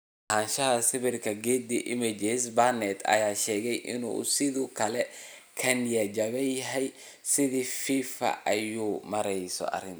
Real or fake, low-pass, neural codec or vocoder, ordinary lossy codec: real; none; none; none